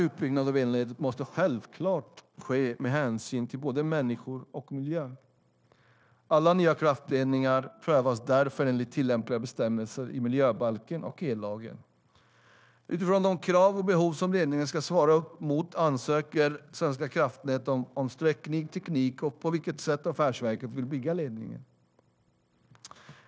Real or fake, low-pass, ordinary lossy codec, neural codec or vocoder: fake; none; none; codec, 16 kHz, 0.9 kbps, LongCat-Audio-Codec